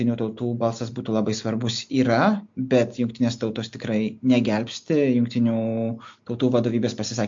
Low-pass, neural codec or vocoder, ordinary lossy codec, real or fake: 7.2 kHz; none; MP3, 48 kbps; real